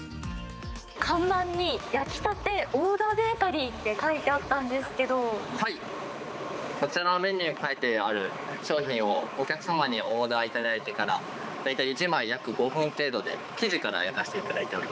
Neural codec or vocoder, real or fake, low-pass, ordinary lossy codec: codec, 16 kHz, 4 kbps, X-Codec, HuBERT features, trained on balanced general audio; fake; none; none